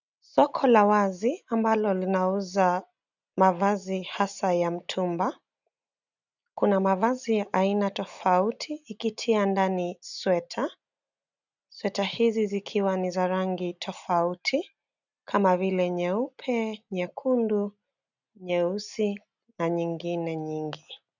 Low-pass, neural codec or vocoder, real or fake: 7.2 kHz; none; real